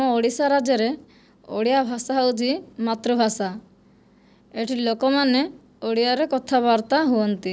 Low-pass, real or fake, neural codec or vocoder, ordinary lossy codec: none; real; none; none